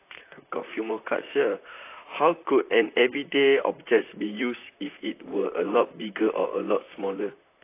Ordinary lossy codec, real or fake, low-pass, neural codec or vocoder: AAC, 24 kbps; fake; 3.6 kHz; vocoder, 44.1 kHz, 128 mel bands, Pupu-Vocoder